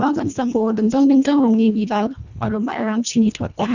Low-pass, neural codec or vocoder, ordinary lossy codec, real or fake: 7.2 kHz; codec, 24 kHz, 1.5 kbps, HILCodec; none; fake